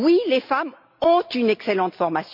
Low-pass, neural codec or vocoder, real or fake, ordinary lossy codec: 5.4 kHz; none; real; MP3, 48 kbps